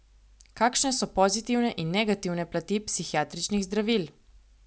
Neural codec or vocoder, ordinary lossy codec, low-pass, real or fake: none; none; none; real